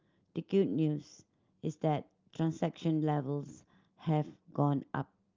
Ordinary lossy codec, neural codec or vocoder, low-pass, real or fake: Opus, 32 kbps; none; 7.2 kHz; real